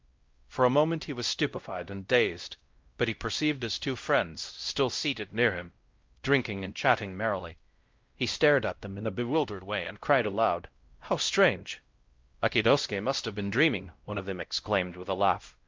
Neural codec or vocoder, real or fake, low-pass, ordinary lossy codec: codec, 16 kHz, 0.5 kbps, X-Codec, WavLM features, trained on Multilingual LibriSpeech; fake; 7.2 kHz; Opus, 24 kbps